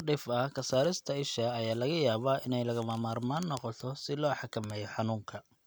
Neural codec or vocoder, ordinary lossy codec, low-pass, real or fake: none; none; none; real